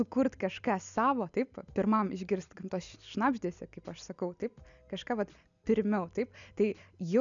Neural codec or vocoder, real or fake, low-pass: none; real; 7.2 kHz